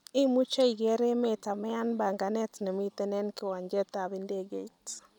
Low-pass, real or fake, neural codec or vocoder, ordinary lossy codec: 19.8 kHz; real; none; none